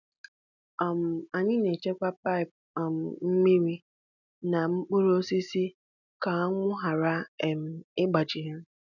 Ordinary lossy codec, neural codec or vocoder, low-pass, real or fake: none; none; 7.2 kHz; real